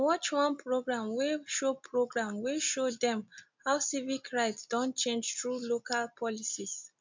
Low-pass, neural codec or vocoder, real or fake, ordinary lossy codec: 7.2 kHz; none; real; MP3, 64 kbps